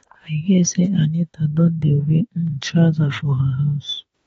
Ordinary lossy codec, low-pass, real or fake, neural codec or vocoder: AAC, 24 kbps; 19.8 kHz; fake; autoencoder, 48 kHz, 32 numbers a frame, DAC-VAE, trained on Japanese speech